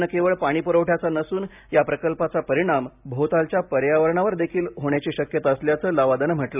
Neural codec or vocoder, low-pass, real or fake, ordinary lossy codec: none; 3.6 kHz; real; none